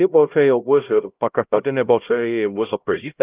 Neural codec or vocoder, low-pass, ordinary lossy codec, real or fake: codec, 16 kHz, 0.5 kbps, X-Codec, HuBERT features, trained on LibriSpeech; 3.6 kHz; Opus, 24 kbps; fake